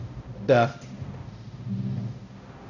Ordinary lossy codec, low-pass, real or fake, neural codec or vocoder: none; 7.2 kHz; fake; codec, 16 kHz, 0.5 kbps, X-Codec, HuBERT features, trained on balanced general audio